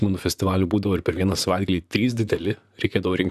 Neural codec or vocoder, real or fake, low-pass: vocoder, 44.1 kHz, 128 mel bands, Pupu-Vocoder; fake; 14.4 kHz